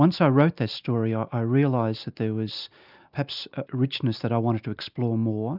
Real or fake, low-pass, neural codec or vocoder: real; 5.4 kHz; none